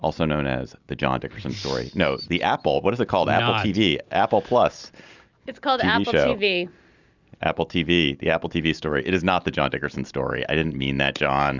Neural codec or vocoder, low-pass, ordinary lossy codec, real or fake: none; 7.2 kHz; Opus, 64 kbps; real